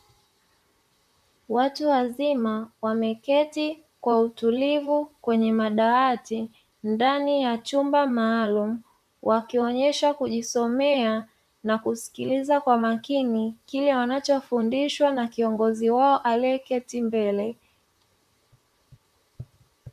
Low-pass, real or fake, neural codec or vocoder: 14.4 kHz; fake; vocoder, 44.1 kHz, 128 mel bands, Pupu-Vocoder